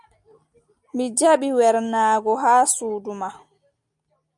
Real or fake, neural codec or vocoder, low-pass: real; none; 10.8 kHz